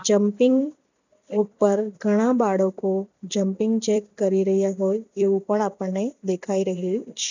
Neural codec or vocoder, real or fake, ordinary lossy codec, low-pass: vocoder, 44.1 kHz, 128 mel bands every 256 samples, BigVGAN v2; fake; none; 7.2 kHz